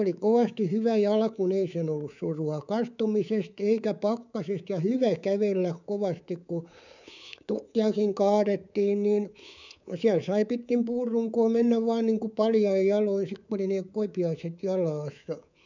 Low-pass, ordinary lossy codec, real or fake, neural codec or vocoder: 7.2 kHz; none; fake; codec, 24 kHz, 3.1 kbps, DualCodec